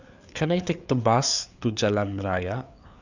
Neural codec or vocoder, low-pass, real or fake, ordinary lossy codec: codec, 16 kHz, 4 kbps, FreqCodec, larger model; 7.2 kHz; fake; none